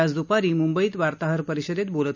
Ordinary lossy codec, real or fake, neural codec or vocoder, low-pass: none; real; none; 7.2 kHz